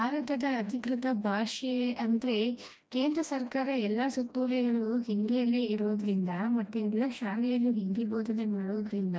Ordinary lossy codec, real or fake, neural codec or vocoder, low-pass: none; fake; codec, 16 kHz, 1 kbps, FreqCodec, smaller model; none